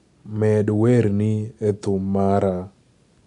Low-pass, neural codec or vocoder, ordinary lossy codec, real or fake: 10.8 kHz; none; none; real